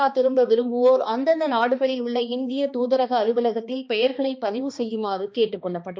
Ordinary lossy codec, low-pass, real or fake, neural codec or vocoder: none; none; fake; codec, 16 kHz, 2 kbps, X-Codec, HuBERT features, trained on balanced general audio